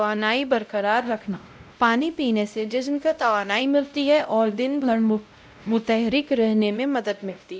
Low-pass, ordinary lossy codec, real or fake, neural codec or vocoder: none; none; fake; codec, 16 kHz, 0.5 kbps, X-Codec, WavLM features, trained on Multilingual LibriSpeech